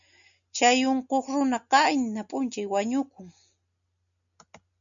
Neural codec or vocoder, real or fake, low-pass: none; real; 7.2 kHz